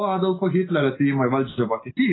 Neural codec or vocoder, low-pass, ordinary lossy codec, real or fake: none; 7.2 kHz; AAC, 16 kbps; real